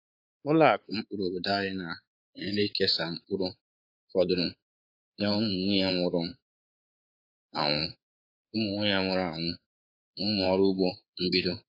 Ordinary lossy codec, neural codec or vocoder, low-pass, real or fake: AAC, 32 kbps; codec, 24 kHz, 3.1 kbps, DualCodec; 5.4 kHz; fake